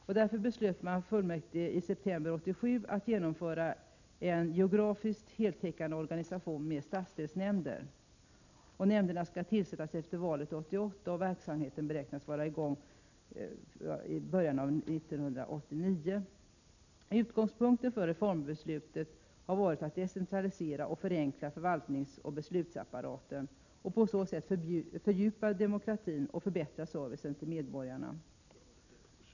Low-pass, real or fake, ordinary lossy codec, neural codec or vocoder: 7.2 kHz; real; none; none